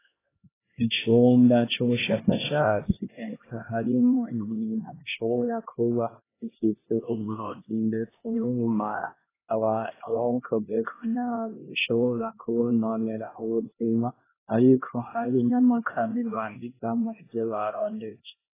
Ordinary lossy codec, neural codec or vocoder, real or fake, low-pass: AAC, 16 kbps; codec, 16 kHz, 1 kbps, X-Codec, HuBERT features, trained on LibriSpeech; fake; 3.6 kHz